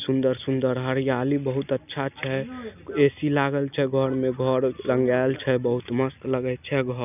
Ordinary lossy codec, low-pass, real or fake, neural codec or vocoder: none; 3.6 kHz; real; none